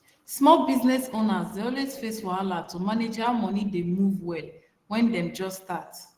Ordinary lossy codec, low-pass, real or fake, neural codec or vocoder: Opus, 16 kbps; 14.4 kHz; real; none